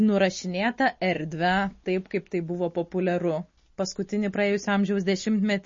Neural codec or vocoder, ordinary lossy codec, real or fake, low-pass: none; MP3, 32 kbps; real; 7.2 kHz